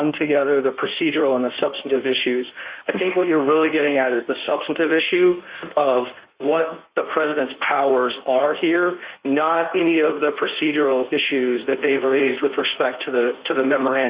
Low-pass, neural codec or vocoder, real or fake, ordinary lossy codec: 3.6 kHz; codec, 16 kHz in and 24 kHz out, 1.1 kbps, FireRedTTS-2 codec; fake; Opus, 64 kbps